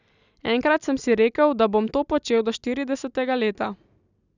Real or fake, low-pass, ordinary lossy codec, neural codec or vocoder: real; 7.2 kHz; none; none